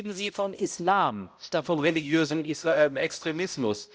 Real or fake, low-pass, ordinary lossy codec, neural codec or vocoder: fake; none; none; codec, 16 kHz, 0.5 kbps, X-Codec, HuBERT features, trained on balanced general audio